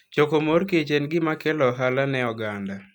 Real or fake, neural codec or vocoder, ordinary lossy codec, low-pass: real; none; none; 19.8 kHz